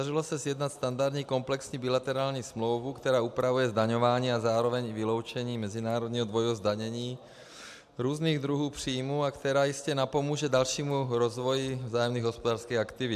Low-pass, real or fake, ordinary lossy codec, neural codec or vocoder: 14.4 kHz; real; AAC, 96 kbps; none